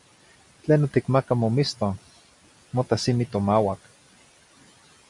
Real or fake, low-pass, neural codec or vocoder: real; 10.8 kHz; none